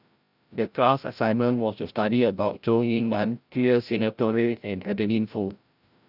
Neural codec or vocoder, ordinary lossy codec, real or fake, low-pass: codec, 16 kHz, 0.5 kbps, FreqCodec, larger model; AAC, 48 kbps; fake; 5.4 kHz